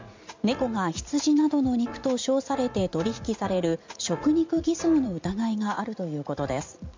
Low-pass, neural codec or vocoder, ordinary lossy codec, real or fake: 7.2 kHz; vocoder, 44.1 kHz, 80 mel bands, Vocos; MP3, 64 kbps; fake